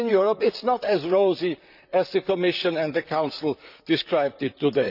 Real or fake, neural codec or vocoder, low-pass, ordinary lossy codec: fake; vocoder, 44.1 kHz, 128 mel bands, Pupu-Vocoder; 5.4 kHz; none